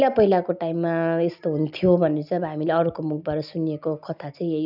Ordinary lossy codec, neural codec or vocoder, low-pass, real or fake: none; none; 5.4 kHz; real